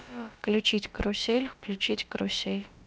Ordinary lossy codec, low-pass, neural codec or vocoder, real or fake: none; none; codec, 16 kHz, about 1 kbps, DyCAST, with the encoder's durations; fake